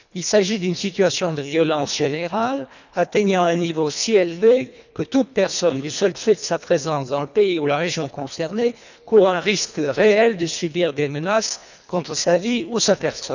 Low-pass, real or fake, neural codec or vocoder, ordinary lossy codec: 7.2 kHz; fake; codec, 24 kHz, 1.5 kbps, HILCodec; none